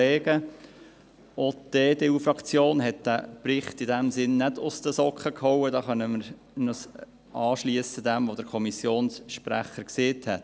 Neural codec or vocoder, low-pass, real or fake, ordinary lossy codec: none; none; real; none